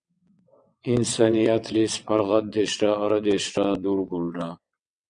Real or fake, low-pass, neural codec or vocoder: fake; 9.9 kHz; vocoder, 22.05 kHz, 80 mel bands, WaveNeXt